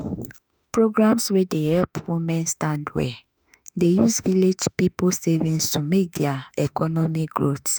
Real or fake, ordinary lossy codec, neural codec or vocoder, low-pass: fake; none; autoencoder, 48 kHz, 32 numbers a frame, DAC-VAE, trained on Japanese speech; none